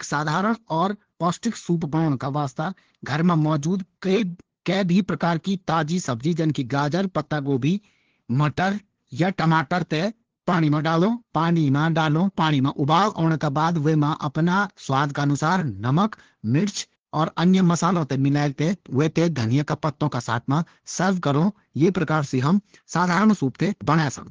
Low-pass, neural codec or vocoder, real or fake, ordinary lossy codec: 7.2 kHz; codec, 16 kHz, 2 kbps, FunCodec, trained on LibriTTS, 25 frames a second; fake; Opus, 16 kbps